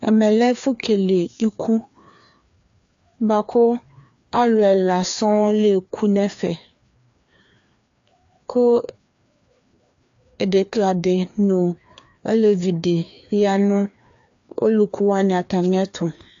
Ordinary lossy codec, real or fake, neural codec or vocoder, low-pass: AAC, 64 kbps; fake; codec, 16 kHz, 2 kbps, FreqCodec, larger model; 7.2 kHz